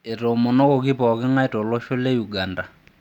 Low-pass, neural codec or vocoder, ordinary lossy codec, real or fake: 19.8 kHz; none; none; real